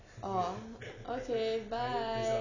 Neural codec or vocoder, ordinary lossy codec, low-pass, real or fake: none; none; 7.2 kHz; real